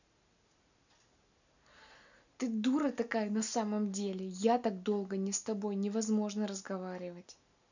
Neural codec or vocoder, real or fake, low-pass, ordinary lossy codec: none; real; 7.2 kHz; none